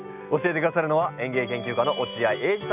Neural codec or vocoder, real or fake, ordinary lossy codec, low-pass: none; real; none; 3.6 kHz